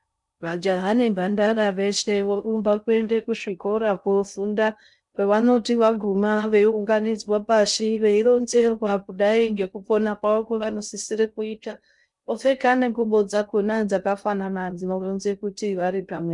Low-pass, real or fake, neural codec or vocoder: 10.8 kHz; fake; codec, 16 kHz in and 24 kHz out, 0.6 kbps, FocalCodec, streaming, 4096 codes